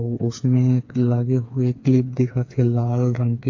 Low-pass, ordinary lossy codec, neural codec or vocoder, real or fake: 7.2 kHz; AAC, 48 kbps; codec, 16 kHz, 4 kbps, FreqCodec, smaller model; fake